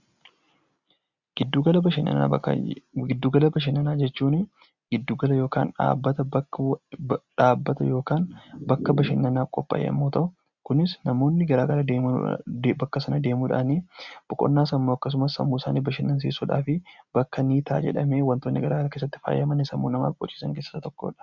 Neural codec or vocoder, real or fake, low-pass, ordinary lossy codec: none; real; 7.2 kHz; Opus, 64 kbps